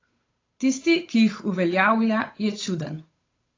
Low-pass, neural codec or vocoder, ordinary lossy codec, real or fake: 7.2 kHz; codec, 16 kHz, 8 kbps, FunCodec, trained on Chinese and English, 25 frames a second; AAC, 32 kbps; fake